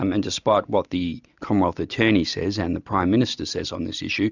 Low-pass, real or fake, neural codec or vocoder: 7.2 kHz; real; none